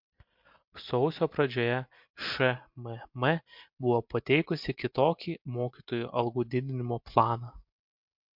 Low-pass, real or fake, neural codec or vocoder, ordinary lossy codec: 5.4 kHz; real; none; MP3, 48 kbps